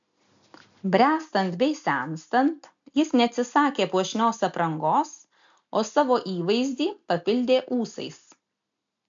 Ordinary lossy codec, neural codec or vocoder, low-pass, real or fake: AAC, 64 kbps; none; 7.2 kHz; real